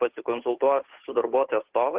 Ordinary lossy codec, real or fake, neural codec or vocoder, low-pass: Opus, 16 kbps; fake; codec, 16 kHz, 8 kbps, FunCodec, trained on Chinese and English, 25 frames a second; 3.6 kHz